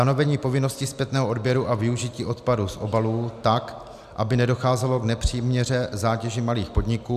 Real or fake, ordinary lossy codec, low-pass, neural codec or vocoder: real; AAC, 96 kbps; 14.4 kHz; none